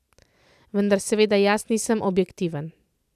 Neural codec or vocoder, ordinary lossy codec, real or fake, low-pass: none; none; real; 14.4 kHz